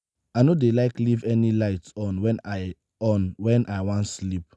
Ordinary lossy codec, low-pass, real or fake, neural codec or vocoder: none; none; real; none